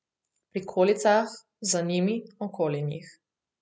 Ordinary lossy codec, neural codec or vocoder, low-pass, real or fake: none; none; none; real